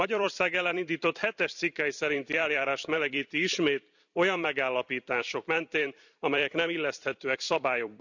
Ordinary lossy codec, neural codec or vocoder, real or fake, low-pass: none; vocoder, 44.1 kHz, 128 mel bands every 256 samples, BigVGAN v2; fake; 7.2 kHz